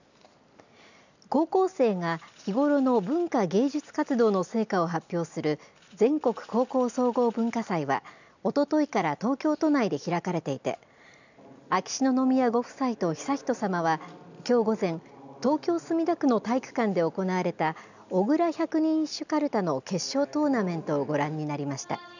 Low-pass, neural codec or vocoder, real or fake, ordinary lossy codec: 7.2 kHz; none; real; none